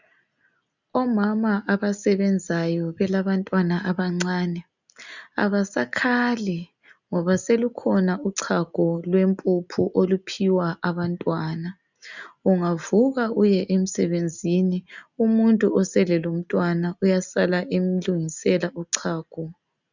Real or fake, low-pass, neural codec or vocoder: real; 7.2 kHz; none